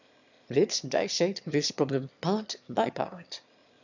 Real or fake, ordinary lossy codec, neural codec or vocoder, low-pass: fake; none; autoencoder, 22.05 kHz, a latent of 192 numbers a frame, VITS, trained on one speaker; 7.2 kHz